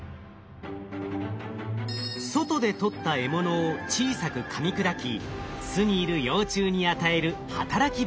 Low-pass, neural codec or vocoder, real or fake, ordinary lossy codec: none; none; real; none